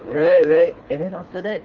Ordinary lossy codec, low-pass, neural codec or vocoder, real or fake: Opus, 32 kbps; 7.2 kHz; codec, 24 kHz, 3 kbps, HILCodec; fake